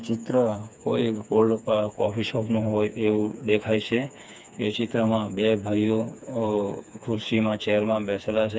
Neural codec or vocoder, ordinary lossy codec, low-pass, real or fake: codec, 16 kHz, 4 kbps, FreqCodec, smaller model; none; none; fake